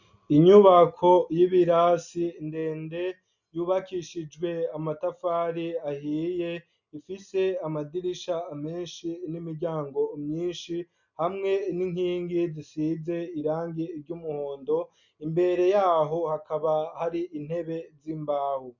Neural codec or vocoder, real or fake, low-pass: none; real; 7.2 kHz